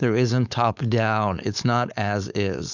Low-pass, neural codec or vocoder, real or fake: 7.2 kHz; codec, 16 kHz, 4.8 kbps, FACodec; fake